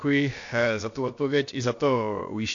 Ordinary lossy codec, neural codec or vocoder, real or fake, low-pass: AAC, 48 kbps; codec, 16 kHz, about 1 kbps, DyCAST, with the encoder's durations; fake; 7.2 kHz